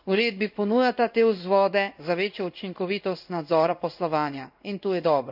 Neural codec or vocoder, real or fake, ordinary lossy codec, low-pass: codec, 16 kHz in and 24 kHz out, 1 kbps, XY-Tokenizer; fake; none; 5.4 kHz